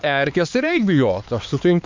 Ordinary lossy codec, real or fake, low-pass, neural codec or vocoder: MP3, 64 kbps; fake; 7.2 kHz; codec, 16 kHz, 4 kbps, X-Codec, HuBERT features, trained on LibriSpeech